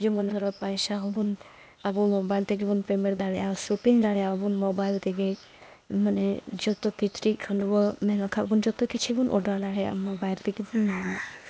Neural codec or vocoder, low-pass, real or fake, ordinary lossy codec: codec, 16 kHz, 0.8 kbps, ZipCodec; none; fake; none